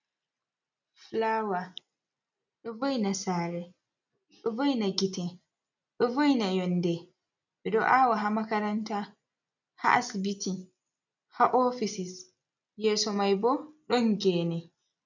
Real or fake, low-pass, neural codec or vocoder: real; 7.2 kHz; none